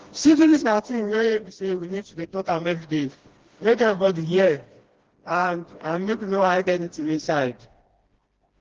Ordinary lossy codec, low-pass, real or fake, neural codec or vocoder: Opus, 16 kbps; 7.2 kHz; fake; codec, 16 kHz, 1 kbps, FreqCodec, smaller model